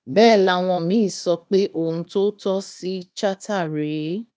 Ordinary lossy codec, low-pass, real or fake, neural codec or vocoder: none; none; fake; codec, 16 kHz, 0.8 kbps, ZipCodec